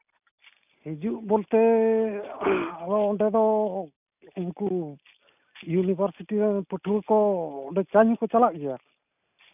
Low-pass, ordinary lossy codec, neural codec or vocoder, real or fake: 3.6 kHz; none; none; real